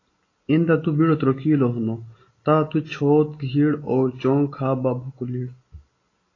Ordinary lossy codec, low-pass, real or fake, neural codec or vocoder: AAC, 32 kbps; 7.2 kHz; real; none